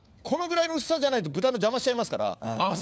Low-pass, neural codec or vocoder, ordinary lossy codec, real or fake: none; codec, 16 kHz, 4 kbps, FunCodec, trained on LibriTTS, 50 frames a second; none; fake